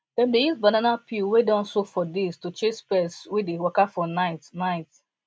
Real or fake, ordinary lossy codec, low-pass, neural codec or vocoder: real; none; none; none